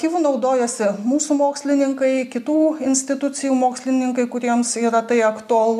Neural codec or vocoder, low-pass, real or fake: none; 14.4 kHz; real